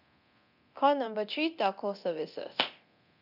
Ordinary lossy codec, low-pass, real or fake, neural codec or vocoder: none; 5.4 kHz; fake; codec, 24 kHz, 0.9 kbps, DualCodec